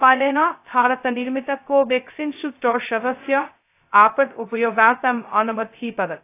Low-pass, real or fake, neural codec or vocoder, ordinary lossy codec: 3.6 kHz; fake; codec, 16 kHz, 0.2 kbps, FocalCodec; AAC, 24 kbps